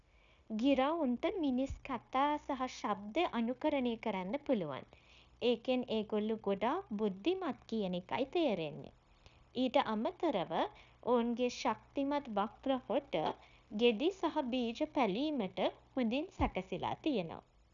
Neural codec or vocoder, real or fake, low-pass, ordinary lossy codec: codec, 16 kHz, 0.9 kbps, LongCat-Audio-Codec; fake; 7.2 kHz; none